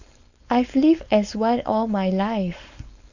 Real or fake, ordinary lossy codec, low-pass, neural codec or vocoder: fake; none; 7.2 kHz; codec, 16 kHz, 4.8 kbps, FACodec